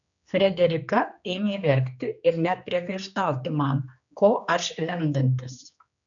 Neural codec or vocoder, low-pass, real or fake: codec, 16 kHz, 2 kbps, X-Codec, HuBERT features, trained on general audio; 7.2 kHz; fake